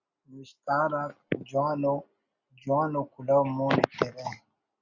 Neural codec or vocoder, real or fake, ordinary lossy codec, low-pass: none; real; Opus, 64 kbps; 7.2 kHz